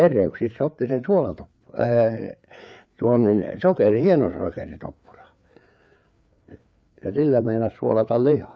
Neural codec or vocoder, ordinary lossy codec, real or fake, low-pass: codec, 16 kHz, 4 kbps, FreqCodec, larger model; none; fake; none